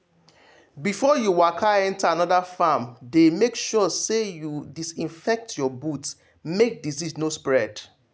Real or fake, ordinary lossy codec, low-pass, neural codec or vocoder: real; none; none; none